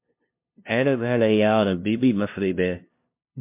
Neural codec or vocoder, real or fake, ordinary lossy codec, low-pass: codec, 16 kHz, 0.5 kbps, FunCodec, trained on LibriTTS, 25 frames a second; fake; MP3, 32 kbps; 3.6 kHz